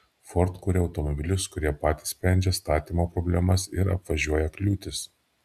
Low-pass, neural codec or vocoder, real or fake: 14.4 kHz; none; real